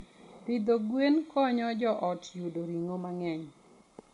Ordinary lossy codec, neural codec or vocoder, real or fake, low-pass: MP3, 64 kbps; none; real; 10.8 kHz